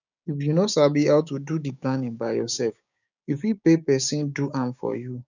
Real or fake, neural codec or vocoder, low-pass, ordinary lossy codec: fake; codec, 16 kHz, 6 kbps, DAC; 7.2 kHz; none